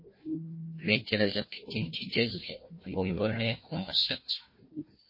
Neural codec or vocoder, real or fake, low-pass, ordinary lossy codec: codec, 16 kHz, 1 kbps, FunCodec, trained on Chinese and English, 50 frames a second; fake; 5.4 kHz; MP3, 24 kbps